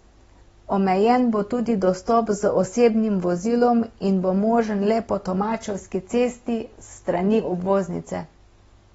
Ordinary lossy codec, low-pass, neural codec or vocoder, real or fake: AAC, 24 kbps; 19.8 kHz; none; real